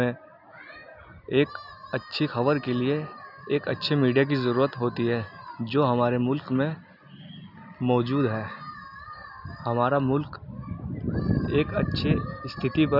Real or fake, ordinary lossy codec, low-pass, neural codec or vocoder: real; none; 5.4 kHz; none